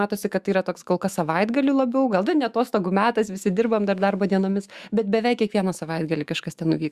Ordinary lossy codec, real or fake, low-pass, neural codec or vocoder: Opus, 64 kbps; fake; 14.4 kHz; autoencoder, 48 kHz, 128 numbers a frame, DAC-VAE, trained on Japanese speech